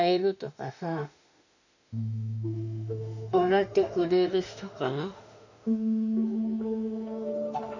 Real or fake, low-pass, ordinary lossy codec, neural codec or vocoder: fake; 7.2 kHz; none; autoencoder, 48 kHz, 32 numbers a frame, DAC-VAE, trained on Japanese speech